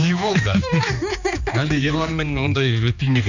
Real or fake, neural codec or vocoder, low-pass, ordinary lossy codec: fake; codec, 16 kHz, 2 kbps, X-Codec, HuBERT features, trained on general audio; 7.2 kHz; none